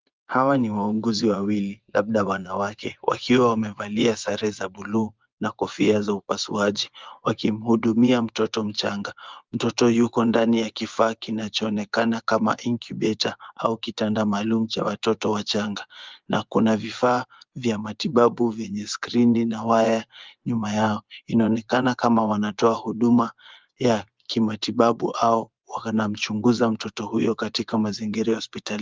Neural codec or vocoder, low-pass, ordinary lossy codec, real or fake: vocoder, 24 kHz, 100 mel bands, Vocos; 7.2 kHz; Opus, 32 kbps; fake